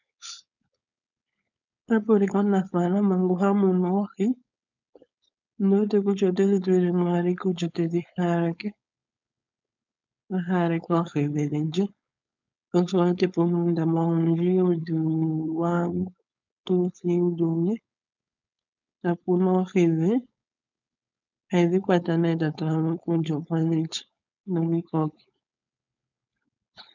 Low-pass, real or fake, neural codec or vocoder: 7.2 kHz; fake; codec, 16 kHz, 4.8 kbps, FACodec